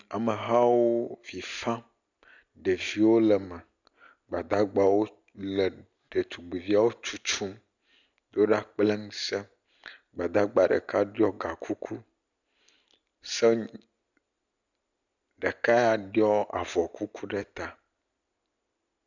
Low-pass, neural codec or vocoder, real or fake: 7.2 kHz; none; real